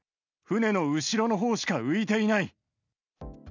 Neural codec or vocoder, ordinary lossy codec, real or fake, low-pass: none; none; real; 7.2 kHz